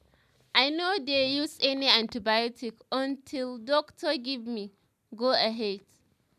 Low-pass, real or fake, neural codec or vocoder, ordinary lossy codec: 14.4 kHz; real; none; none